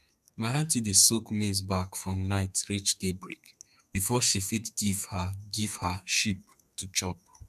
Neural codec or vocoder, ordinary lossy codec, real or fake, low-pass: codec, 32 kHz, 1.9 kbps, SNAC; none; fake; 14.4 kHz